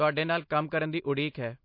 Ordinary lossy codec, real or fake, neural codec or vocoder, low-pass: MP3, 32 kbps; real; none; 5.4 kHz